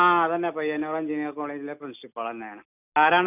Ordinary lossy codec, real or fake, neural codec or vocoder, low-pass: none; real; none; 3.6 kHz